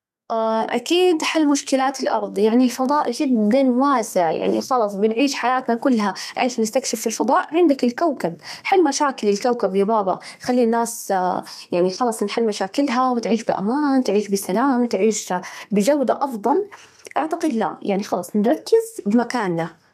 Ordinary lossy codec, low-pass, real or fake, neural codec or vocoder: none; 14.4 kHz; fake; codec, 32 kHz, 1.9 kbps, SNAC